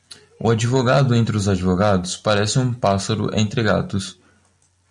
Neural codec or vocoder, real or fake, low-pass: none; real; 10.8 kHz